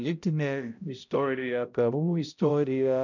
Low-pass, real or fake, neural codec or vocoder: 7.2 kHz; fake; codec, 16 kHz, 0.5 kbps, X-Codec, HuBERT features, trained on balanced general audio